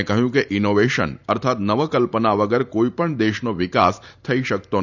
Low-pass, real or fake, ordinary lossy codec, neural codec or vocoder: 7.2 kHz; fake; none; vocoder, 44.1 kHz, 128 mel bands every 512 samples, BigVGAN v2